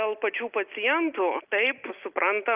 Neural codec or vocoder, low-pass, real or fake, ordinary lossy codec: none; 3.6 kHz; real; Opus, 32 kbps